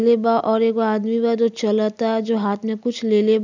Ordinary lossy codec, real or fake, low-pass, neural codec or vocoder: none; real; 7.2 kHz; none